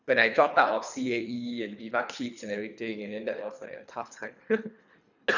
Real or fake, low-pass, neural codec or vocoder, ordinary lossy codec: fake; 7.2 kHz; codec, 24 kHz, 3 kbps, HILCodec; none